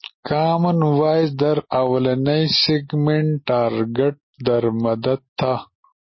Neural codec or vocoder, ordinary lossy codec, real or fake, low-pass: none; MP3, 24 kbps; real; 7.2 kHz